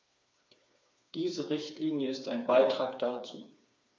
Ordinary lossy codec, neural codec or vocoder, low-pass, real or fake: none; codec, 16 kHz, 4 kbps, FreqCodec, smaller model; none; fake